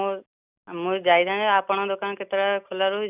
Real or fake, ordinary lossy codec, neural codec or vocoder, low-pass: real; none; none; 3.6 kHz